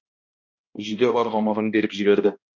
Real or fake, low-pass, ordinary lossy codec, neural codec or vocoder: fake; 7.2 kHz; MP3, 48 kbps; codec, 16 kHz, 1 kbps, X-Codec, HuBERT features, trained on balanced general audio